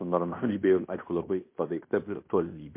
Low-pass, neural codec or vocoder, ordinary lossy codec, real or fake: 3.6 kHz; codec, 16 kHz in and 24 kHz out, 0.9 kbps, LongCat-Audio-Codec, fine tuned four codebook decoder; MP3, 24 kbps; fake